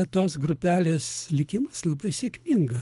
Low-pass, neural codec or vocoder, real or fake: 10.8 kHz; codec, 24 kHz, 3 kbps, HILCodec; fake